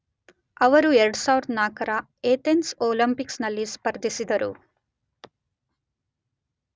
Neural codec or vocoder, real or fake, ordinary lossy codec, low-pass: none; real; none; none